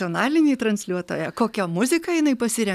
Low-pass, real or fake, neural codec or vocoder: 14.4 kHz; real; none